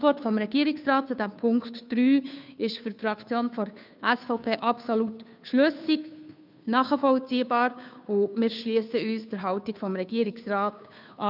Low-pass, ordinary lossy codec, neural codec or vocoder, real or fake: 5.4 kHz; none; codec, 16 kHz, 2 kbps, FunCodec, trained on Chinese and English, 25 frames a second; fake